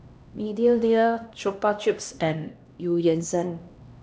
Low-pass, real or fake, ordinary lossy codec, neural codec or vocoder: none; fake; none; codec, 16 kHz, 1 kbps, X-Codec, HuBERT features, trained on LibriSpeech